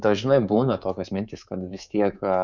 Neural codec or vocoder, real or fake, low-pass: codec, 24 kHz, 3.1 kbps, DualCodec; fake; 7.2 kHz